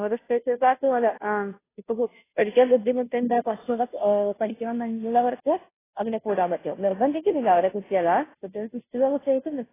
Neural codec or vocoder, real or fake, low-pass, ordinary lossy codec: codec, 16 kHz, 0.5 kbps, FunCodec, trained on Chinese and English, 25 frames a second; fake; 3.6 kHz; AAC, 16 kbps